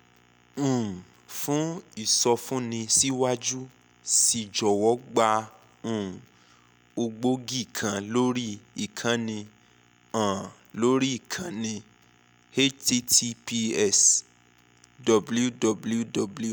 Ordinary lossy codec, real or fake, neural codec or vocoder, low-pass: none; real; none; none